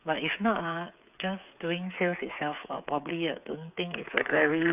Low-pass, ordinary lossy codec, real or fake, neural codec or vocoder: 3.6 kHz; none; fake; codec, 16 kHz, 8 kbps, FreqCodec, smaller model